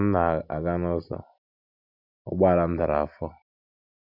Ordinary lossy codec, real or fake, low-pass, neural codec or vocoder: none; real; 5.4 kHz; none